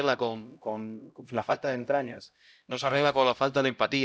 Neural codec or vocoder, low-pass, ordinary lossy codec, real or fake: codec, 16 kHz, 0.5 kbps, X-Codec, HuBERT features, trained on LibriSpeech; none; none; fake